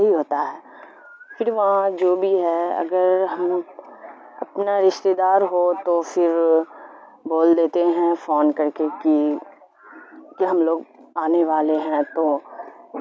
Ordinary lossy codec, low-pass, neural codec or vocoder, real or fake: none; none; none; real